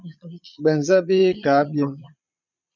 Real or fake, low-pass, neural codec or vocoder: fake; 7.2 kHz; vocoder, 22.05 kHz, 80 mel bands, Vocos